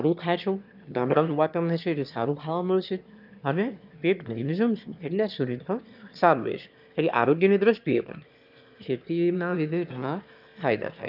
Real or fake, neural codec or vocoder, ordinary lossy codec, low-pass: fake; autoencoder, 22.05 kHz, a latent of 192 numbers a frame, VITS, trained on one speaker; none; 5.4 kHz